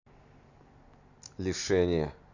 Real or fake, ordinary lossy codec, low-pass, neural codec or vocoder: real; none; 7.2 kHz; none